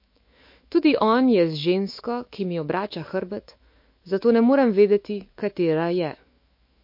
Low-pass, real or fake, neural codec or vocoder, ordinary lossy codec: 5.4 kHz; fake; autoencoder, 48 kHz, 128 numbers a frame, DAC-VAE, trained on Japanese speech; MP3, 32 kbps